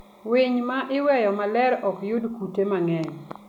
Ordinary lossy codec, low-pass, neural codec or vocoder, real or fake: none; 19.8 kHz; none; real